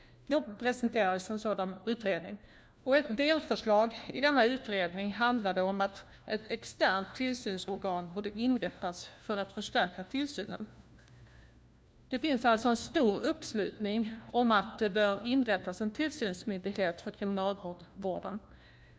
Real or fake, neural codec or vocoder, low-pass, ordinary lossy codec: fake; codec, 16 kHz, 1 kbps, FunCodec, trained on LibriTTS, 50 frames a second; none; none